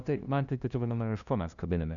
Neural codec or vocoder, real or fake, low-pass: codec, 16 kHz, 0.5 kbps, FunCodec, trained on LibriTTS, 25 frames a second; fake; 7.2 kHz